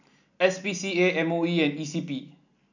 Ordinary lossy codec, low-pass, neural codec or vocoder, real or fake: none; 7.2 kHz; none; real